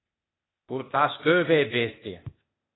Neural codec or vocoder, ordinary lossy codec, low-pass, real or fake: codec, 16 kHz, 0.8 kbps, ZipCodec; AAC, 16 kbps; 7.2 kHz; fake